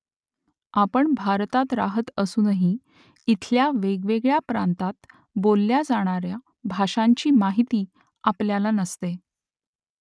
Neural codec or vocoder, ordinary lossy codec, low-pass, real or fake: none; none; none; real